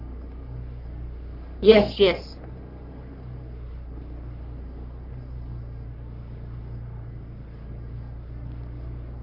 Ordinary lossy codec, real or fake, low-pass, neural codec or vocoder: none; fake; 5.4 kHz; codec, 44.1 kHz, 3.4 kbps, Pupu-Codec